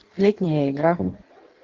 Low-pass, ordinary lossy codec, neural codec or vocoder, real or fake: 7.2 kHz; Opus, 16 kbps; codec, 16 kHz in and 24 kHz out, 1.1 kbps, FireRedTTS-2 codec; fake